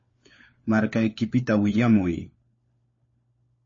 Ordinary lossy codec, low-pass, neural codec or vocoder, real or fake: MP3, 32 kbps; 7.2 kHz; codec, 16 kHz, 4 kbps, FunCodec, trained on LibriTTS, 50 frames a second; fake